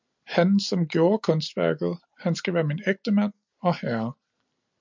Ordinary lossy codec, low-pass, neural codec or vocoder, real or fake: MP3, 64 kbps; 7.2 kHz; none; real